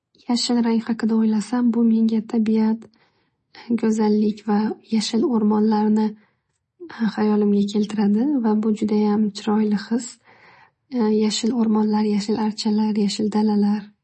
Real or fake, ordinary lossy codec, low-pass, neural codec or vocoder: real; MP3, 32 kbps; 10.8 kHz; none